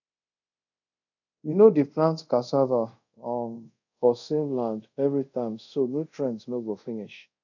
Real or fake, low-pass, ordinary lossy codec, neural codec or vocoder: fake; 7.2 kHz; none; codec, 24 kHz, 0.5 kbps, DualCodec